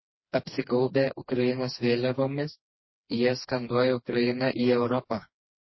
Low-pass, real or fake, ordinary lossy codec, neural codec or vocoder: 7.2 kHz; fake; MP3, 24 kbps; codec, 16 kHz, 2 kbps, FreqCodec, smaller model